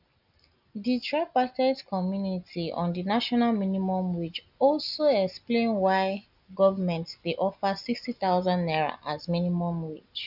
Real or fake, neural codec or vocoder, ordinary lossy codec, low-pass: real; none; none; 5.4 kHz